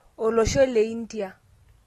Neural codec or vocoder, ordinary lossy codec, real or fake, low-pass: none; AAC, 32 kbps; real; 19.8 kHz